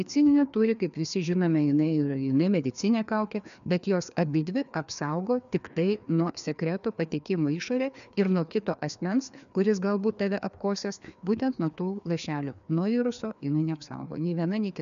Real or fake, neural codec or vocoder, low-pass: fake; codec, 16 kHz, 2 kbps, FreqCodec, larger model; 7.2 kHz